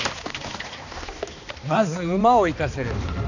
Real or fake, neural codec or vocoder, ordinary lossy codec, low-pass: fake; codec, 16 kHz, 2 kbps, X-Codec, HuBERT features, trained on balanced general audio; none; 7.2 kHz